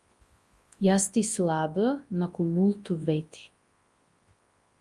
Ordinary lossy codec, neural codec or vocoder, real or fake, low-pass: Opus, 32 kbps; codec, 24 kHz, 0.9 kbps, WavTokenizer, large speech release; fake; 10.8 kHz